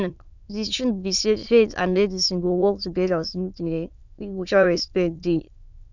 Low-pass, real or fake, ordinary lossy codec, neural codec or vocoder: 7.2 kHz; fake; none; autoencoder, 22.05 kHz, a latent of 192 numbers a frame, VITS, trained on many speakers